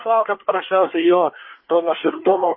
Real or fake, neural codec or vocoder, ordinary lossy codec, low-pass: fake; codec, 24 kHz, 1 kbps, SNAC; MP3, 24 kbps; 7.2 kHz